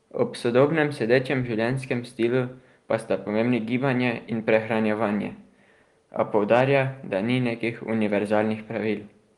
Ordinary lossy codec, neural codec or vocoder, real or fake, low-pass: Opus, 24 kbps; none; real; 10.8 kHz